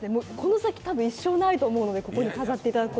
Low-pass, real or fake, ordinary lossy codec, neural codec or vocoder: none; real; none; none